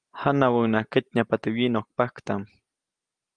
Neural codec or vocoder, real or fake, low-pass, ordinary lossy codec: none; real; 9.9 kHz; Opus, 32 kbps